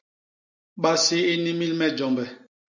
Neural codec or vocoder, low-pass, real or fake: none; 7.2 kHz; real